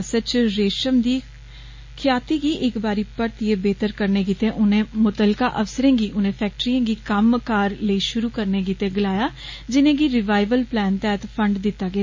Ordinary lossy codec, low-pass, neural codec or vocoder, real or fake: MP3, 32 kbps; 7.2 kHz; none; real